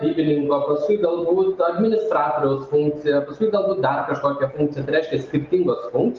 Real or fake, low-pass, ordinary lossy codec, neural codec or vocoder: real; 7.2 kHz; Opus, 32 kbps; none